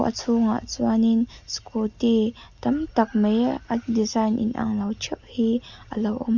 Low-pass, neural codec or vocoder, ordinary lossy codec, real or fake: 7.2 kHz; none; Opus, 64 kbps; real